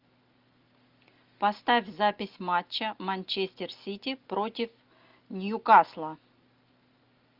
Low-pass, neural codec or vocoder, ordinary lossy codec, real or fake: 5.4 kHz; none; Opus, 24 kbps; real